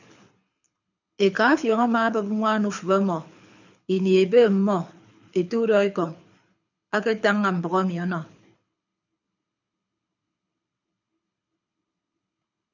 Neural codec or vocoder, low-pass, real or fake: codec, 24 kHz, 6 kbps, HILCodec; 7.2 kHz; fake